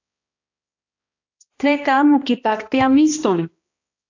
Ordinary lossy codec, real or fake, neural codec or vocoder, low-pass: AAC, 48 kbps; fake; codec, 16 kHz, 1 kbps, X-Codec, HuBERT features, trained on balanced general audio; 7.2 kHz